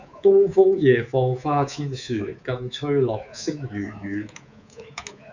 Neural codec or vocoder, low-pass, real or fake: codec, 24 kHz, 3.1 kbps, DualCodec; 7.2 kHz; fake